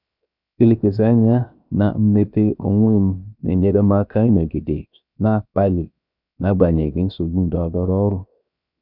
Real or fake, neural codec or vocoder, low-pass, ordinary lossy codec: fake; codec, 16 kHz, 0.7 kbps, FocalCodec; 5.4 kHz; none